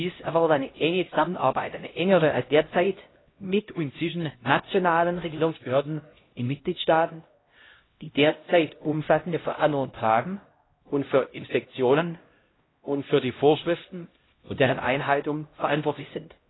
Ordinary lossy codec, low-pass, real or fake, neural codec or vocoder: AAC, 16 kbps; 7.2 kHz; fake; codec, 16 kHz, 0.5 kbps, X-Codec, HuBERT features, trained on LibriSpeech